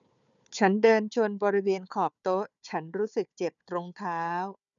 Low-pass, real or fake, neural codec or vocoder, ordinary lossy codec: 7.2 kHz; fake; codec, 16 kHz, 4 kbps, FunCodec, trained on Chinese and English, 50 frames a second; none